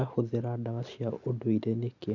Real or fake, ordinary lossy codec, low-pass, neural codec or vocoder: real; none; 7.2 kHz; none